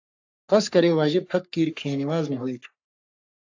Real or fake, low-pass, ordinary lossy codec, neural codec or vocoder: fake; 7.2 kHz; AAC, 48 kbps; codec, 44.1 kHz, 3.4 kbps, Pupu-Codec